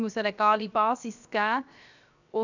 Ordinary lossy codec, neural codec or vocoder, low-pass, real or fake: none; codec, 16 kHz, 0.7 kbps, FocalCodec; 7.2 kHz; fake